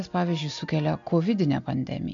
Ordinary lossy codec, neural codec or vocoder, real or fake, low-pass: MP3, 64 kbps; none; real; 7.2 kHz